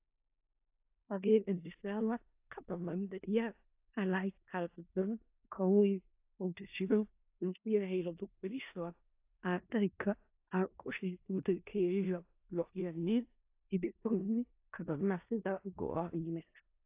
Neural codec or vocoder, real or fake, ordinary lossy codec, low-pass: codec, 16 kHz in and 24 kHz out, 0.4 kbps, LongCat-Audio-Codec, four codebook decoder; fake; AAC, 32 kbps; 3.6 kHz